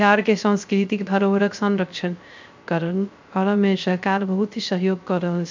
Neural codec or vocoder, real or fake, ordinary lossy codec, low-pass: codec, 16 kHz, 0.3 kbps, FocalCodec; fake; MP3, 64 kbps; 7.2 kHz